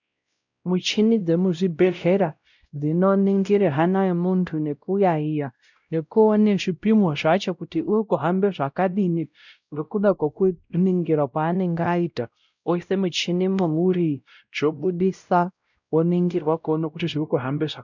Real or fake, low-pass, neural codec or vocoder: fake; 7.2 kHz; codec, 16 kHz, 0.5 kbps, X-Codec, WavLM features, trained on Multilingual LibriSpeech